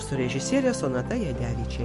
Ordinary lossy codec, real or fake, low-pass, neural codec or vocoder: MP3, 48 kbps; real; 14.4 kHz; none